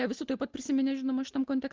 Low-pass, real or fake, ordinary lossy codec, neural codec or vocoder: 7.2 kHz; real; Opus, 16 kbps; none